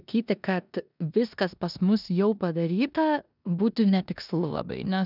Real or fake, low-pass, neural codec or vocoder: fake; 5.4 kHz; codec, 16 kHz in and 24 kHz out, 0.9 kbps, LongCat-Audio-Codec, four codebook decoder